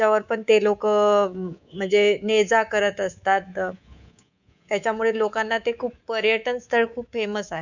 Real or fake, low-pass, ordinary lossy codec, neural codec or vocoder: fake; 7.2 kHz; none; codec, 24 kHz, 3.1 kbps, DualCodec